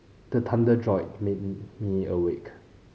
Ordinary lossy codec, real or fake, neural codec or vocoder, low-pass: none; real; none; none